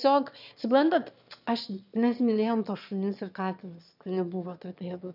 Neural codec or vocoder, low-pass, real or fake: autoencoder, 22.05 kHz, a latent of 192 numbers a frame, VITS, trained on one speaker; 5.4 kHz; fake